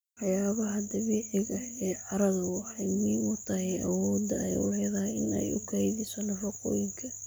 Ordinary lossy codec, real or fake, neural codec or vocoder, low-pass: none; real; none; none